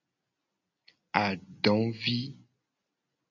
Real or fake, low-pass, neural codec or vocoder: real; 7.2 kHz; none